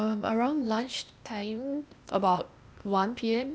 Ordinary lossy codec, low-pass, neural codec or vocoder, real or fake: none; none; codec, 16 kHz, 0.8 kbps, ZipCodec; fake